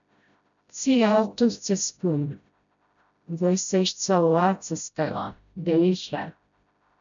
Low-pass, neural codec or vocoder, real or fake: 7.2 kHz; codec, 16 kHz, 0.5 kbps, FreqCodec, smaller model; fake